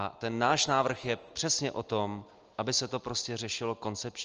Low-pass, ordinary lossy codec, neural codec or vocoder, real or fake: 7.2 kHz; Opus, 24 kbps; none; real